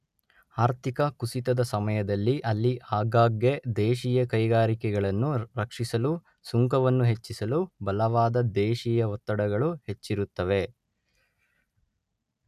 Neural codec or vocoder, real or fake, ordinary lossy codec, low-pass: none; real; none; 14.4 kHz